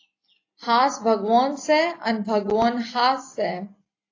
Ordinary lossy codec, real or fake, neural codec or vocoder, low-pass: AAC, 32 kbps; real; none; 7.2 kHz